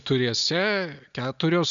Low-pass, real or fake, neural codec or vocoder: 7.2 kHz; real; none